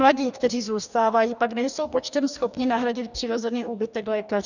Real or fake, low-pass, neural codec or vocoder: fake; 7.2 kHz; codec, 44.1 kHz, 2.6 kbps, DAC